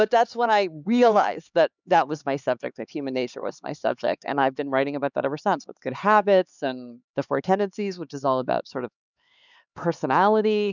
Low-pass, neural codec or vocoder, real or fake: 7.2 kHz; codec, 16 kHz, 4 kbps, X-Codec, HuBERT features, trained on LibriSpeech; fake